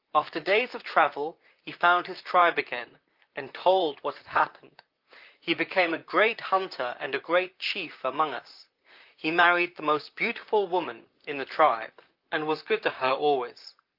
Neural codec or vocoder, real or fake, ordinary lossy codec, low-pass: vocoder, 44.1 kHz, 128 mel bands, Pupu-Vocoder; fake; Opus, 24 kbps; 5.4 kHz